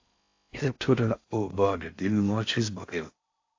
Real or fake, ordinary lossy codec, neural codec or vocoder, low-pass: fake; AAC, 48 kbps; codec, 16 kHz in and 24 kHz out, 0.6 kbps, FocalCodec, streaming, 4096 codes; 7.2 kHz